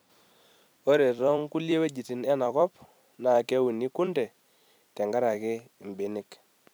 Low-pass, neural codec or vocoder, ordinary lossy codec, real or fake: none; vocoder, 44.1 kHz, 128 mel bands every 256 samples, BigVGAN v2; none; fake